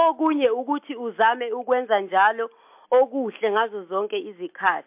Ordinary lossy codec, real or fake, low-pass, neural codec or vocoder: MP3, 32 kbps; real; 3.6 kHz; none